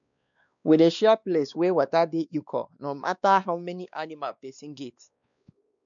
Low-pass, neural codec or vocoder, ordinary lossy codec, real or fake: 7.2 kHz; codec, 16 kHz, 2 kbps, X-Codec, WavLM features, trained on Multilingual LibriSpeech; MP3, 96 kbps; fake